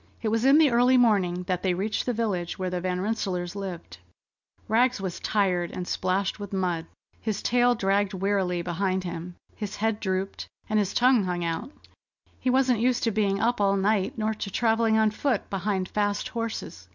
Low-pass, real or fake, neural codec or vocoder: 7.2 kHz; real; none